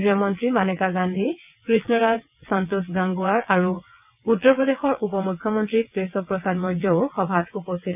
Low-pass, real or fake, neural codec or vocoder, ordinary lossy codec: 3.6 kHz; fake; vocoder, 22.05 kHz, 80 mel bands, WaveNeXt; none